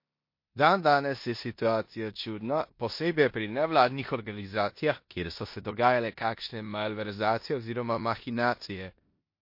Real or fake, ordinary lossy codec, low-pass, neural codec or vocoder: fake; MP3, 32 kbps; 5.4 kHz; codec, 16 kHz in and 24 kHz out, 0.9 kbps, LongCat-Audio-Codec, four codebook decoder